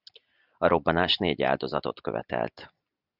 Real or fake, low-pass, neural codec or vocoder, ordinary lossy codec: real; 5.4 kHz; none; Opus, 64 kbps